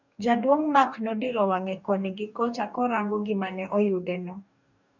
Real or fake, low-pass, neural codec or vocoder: fake; 7.2 kHz; codec, 44.1 kHz, 2.6 kbps, DAC